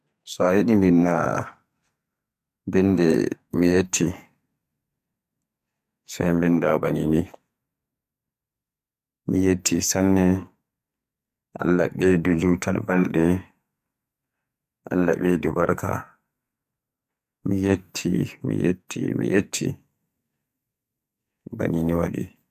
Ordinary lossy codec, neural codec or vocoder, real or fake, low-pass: MP3, 96 kbps; codec, 44.1 kHz, 2.6 kbps, SNAC; fake; 14.4 kHz